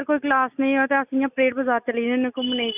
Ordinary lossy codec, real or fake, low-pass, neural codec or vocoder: none; real; 3.6 kHz; none